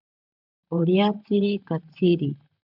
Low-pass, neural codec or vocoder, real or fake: 5.4 kHz; vocoder, 44.1 kHz, 128 mel bands, Pupu-Vocoder; fake